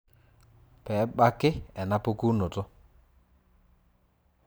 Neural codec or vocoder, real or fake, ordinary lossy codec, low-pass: vocoder, 44.1 kHz, 128 mel bands every 512 samples, BigVGAN v2; fake; none; none